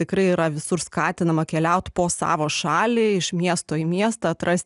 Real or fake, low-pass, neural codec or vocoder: real; 10.8 kHz; none